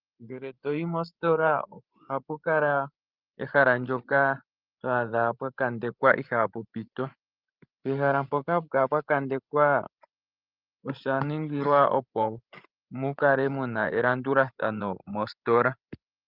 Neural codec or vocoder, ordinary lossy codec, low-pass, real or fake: codec, 16 kHz, 16 kbps, FreqCodec, larger model; Opus, 32 kbps; 5.4 kHz; fake